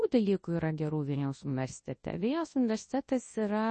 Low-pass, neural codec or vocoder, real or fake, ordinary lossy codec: 10.8 kHz; codec, 24 kHz, 0.9 kbps, WavTokenizer, large speech release; fake; MP3, 32 kbps